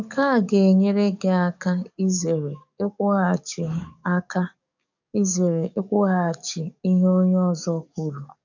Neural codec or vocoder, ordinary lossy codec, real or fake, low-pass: codec, 44.1 kHz, 7.8 kbps, DAC; none; fake; 7.2 kHz